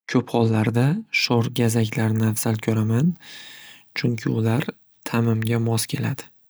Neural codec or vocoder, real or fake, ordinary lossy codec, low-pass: none; real; none; none